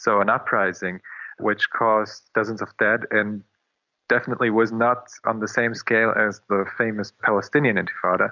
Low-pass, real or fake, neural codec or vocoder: 7.2 kHz; real; none